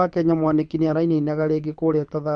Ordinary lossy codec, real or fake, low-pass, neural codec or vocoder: MP3, 64 kbps; fake; 9.9 kHz; codec, 24 kHz, 6 kbps, HILCodec